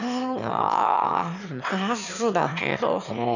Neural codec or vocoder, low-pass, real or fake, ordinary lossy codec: autoencoder, 22.05 kHz, a latent of 192 numbers a frame, VITS, trained on one speaker; 7.2 kHz; fake; none